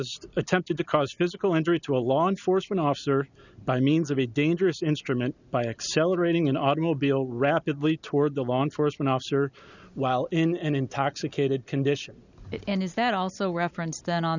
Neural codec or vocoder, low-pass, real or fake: none; 7.2 kHz; real